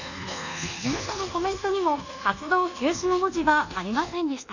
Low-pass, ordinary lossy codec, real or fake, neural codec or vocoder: 7.2 kHz; none; fake; codec, 24 kHz, 1.2 kbps, DualCodec